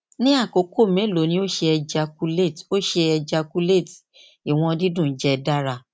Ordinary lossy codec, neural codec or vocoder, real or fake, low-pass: none; none; real; none